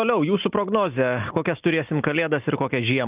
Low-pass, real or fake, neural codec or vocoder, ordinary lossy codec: 3.6 kHz; real; none; Opus, 32 kbps